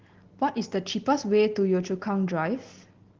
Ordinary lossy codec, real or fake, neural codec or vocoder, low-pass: Opus, 16 kbps; real; none; 7.2 kHz